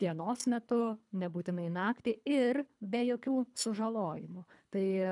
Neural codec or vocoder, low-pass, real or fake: codec, 24 kHz, 3 kbps, HILCodec; 10.8 kHz; fake